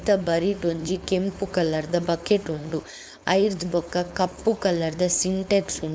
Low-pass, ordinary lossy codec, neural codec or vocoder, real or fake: none; none; codec, 16 kHz, 4.8 kbps, FACodec; fake